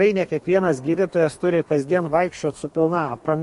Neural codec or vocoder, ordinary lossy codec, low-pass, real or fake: codec, 32 kHz, 1.9 kbps, SNAC; MP3, 48 kbps; 14.4 kHz; fake